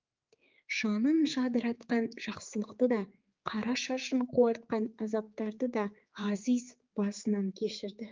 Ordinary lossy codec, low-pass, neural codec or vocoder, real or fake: Opus, 24 kbps; 7.2 kHz; codec, 16 kHz, 4 kbps, X-Codec, HuBERT features, trained on general audio; fake